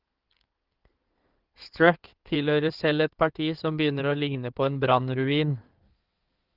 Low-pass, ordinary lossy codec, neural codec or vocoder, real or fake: 5.4 kHz; Opus, 24 kbps; codec, 16 kHz in and 24 kHz out, 2.2 kbps, FireRedTTS-2 codec; fake